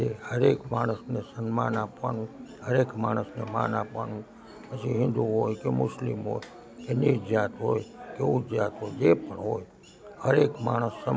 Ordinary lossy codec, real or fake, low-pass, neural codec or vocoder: none; real; none; none